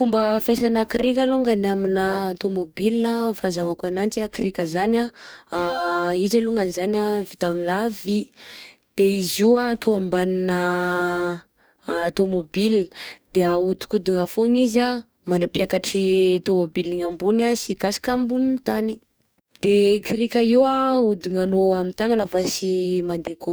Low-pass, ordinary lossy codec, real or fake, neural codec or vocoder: none; none; fake; codec, 44.1 kHz, 2.6 kbps, DAC